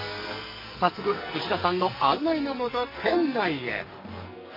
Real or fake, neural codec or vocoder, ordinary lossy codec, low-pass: fake; codec, 44.1 kHz, 2.6 kbps, SNAC; MP3, 24 kbps; 5.4 kHz